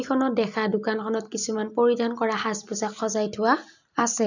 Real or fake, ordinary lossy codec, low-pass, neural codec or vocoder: real; none; 7.2 kHz; none